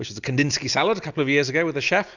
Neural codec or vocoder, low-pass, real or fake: none; 7.2 kHz; real